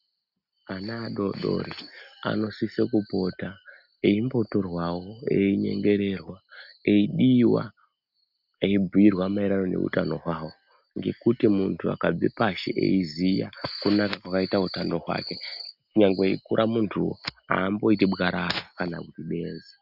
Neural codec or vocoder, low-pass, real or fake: none; 5.4 kHz; real